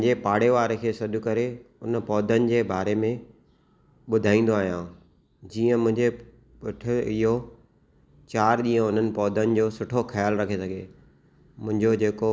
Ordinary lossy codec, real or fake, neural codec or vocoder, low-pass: none; real; none; none